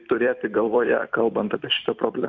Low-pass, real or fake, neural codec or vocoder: 7.2 kHz; fake; vocoder, 44.1 kHz, 128 mel bands every 256 samples, BigVGAN v2